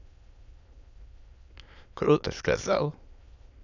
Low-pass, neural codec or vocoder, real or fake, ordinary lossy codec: 7.2 kHz; autoencoder, 22.05 kHz, a latent of 192 numbers a frame, VITS, trained on many speakers; fake; none